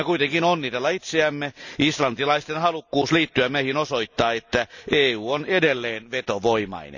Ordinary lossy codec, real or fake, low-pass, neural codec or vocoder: none; real; 7.2 kHz; none